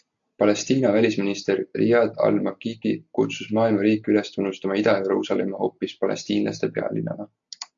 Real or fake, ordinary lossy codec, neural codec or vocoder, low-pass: real; AAC, 64 kbps; none; 7.2 kHz